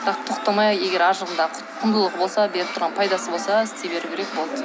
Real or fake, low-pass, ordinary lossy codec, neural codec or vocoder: real; none; none; none